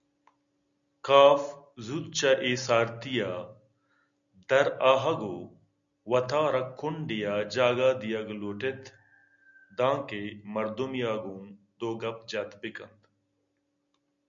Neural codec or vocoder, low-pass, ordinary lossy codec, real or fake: none; 7.2 kHz; MP3, 96 kbps; real